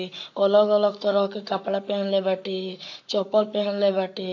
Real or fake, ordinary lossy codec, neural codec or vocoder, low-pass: fake; AAC, 48 kbps; codec, 44.1 kHz, 7.8 kbps, Pupu-Codec; 7.2 kHz